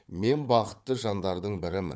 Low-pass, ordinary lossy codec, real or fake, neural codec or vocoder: none; none; fake; codec, 16 kHz, 4 kbps, FunCodec, trained on Chinese and English, 50 frames a second